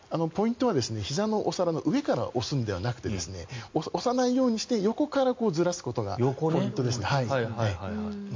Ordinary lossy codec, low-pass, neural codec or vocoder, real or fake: MP3, 48 kbps; 7.2 kHz; none; real